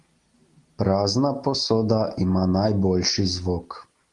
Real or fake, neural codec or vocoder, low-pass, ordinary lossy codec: real; none; 10.8 kHz; Opus, 24 kbps